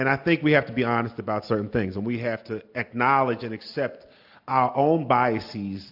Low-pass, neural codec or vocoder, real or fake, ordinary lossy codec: 5.4 kHz; none; real; AAC, 48 kbps